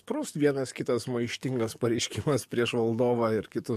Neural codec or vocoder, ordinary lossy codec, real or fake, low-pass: codec, 44.1 kHz, 7.8 kbps, DAC; MP3, 64 kbps; fake; 14.4 kHz